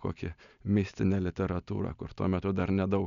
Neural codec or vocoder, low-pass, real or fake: none; 7.2 kHz; real